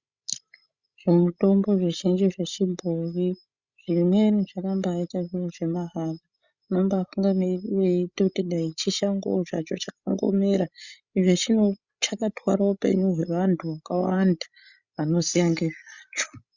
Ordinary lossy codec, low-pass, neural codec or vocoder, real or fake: Opus, 64 kbps; 7.2 kHz; codec, 16 kHz, 16 kbps, FreqCodec, larger model; fake